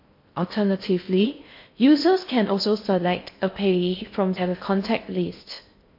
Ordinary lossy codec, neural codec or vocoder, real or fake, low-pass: MP3, 32 kbps; codec, 16 kHz in and 24 kHz out, 0.6 kbps, FocalCodec, streaming, 4096 codes; fake; 5.4 kHz